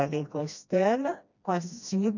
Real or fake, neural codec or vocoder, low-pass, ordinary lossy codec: fake; codec, 16 kHz, 1 kbps, FreqCodec, smaller model; 7.2 kHz; none